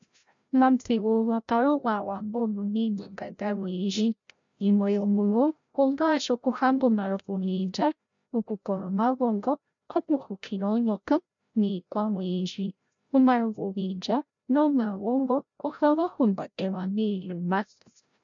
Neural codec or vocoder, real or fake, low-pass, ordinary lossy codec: codec, 16 kHz, 0.5 kbps, FreqCodec, larger model; fake; 7.2 kHz; MP3, 64 kbps